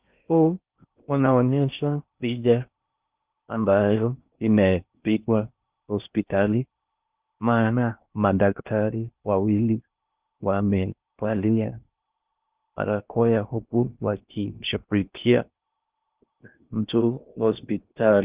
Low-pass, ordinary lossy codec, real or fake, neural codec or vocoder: 3.6 kHz; Opus, 32 kbps; fake; codec, 16 kHz in and 24 kHz out, 0.6 kbps, FocalCodec, streaming, 4096 codes